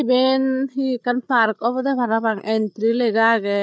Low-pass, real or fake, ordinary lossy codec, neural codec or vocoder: none; fake; none; codec, 16 kHz, 8 kbps, FreqCodec, larger model